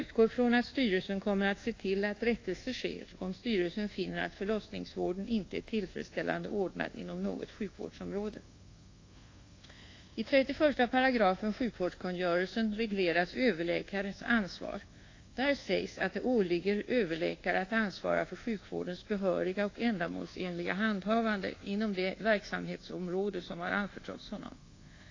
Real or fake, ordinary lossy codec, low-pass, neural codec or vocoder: fake; AAC, 32 kbps; 7.2 kHz; codec, 24 kHz, 1.2 kbps, DualCodec